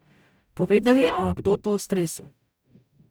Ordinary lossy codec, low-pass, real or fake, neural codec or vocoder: none; none; fake; codec, 44.1 kHz, 0.9 kbps, DAC